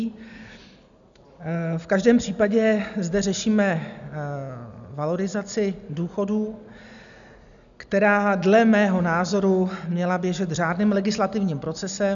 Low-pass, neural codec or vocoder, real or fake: 7.2 kHz; none; real